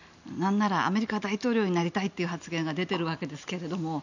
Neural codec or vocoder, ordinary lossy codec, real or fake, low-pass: none; none; real; 7.2 kHz